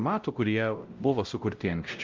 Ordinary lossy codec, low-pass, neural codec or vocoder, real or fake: Opus, 24 kbps; 7.2 kHz; codec, 16 kHz, 0.5 kbps, X-Codec, WavLM features, trained on Multilingual LibriSpeech; fake